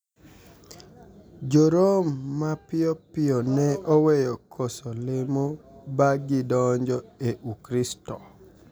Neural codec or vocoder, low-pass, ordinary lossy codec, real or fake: none; none; none; real